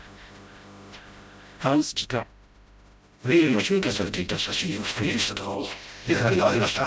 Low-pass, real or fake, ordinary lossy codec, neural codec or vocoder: none; fake; none; codec, 16 kHz, 0.5 kbps, FreqCodec, smaller model